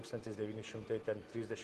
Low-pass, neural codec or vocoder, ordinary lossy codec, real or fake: 14.4 kHz; none; Opus, 16 kbps; real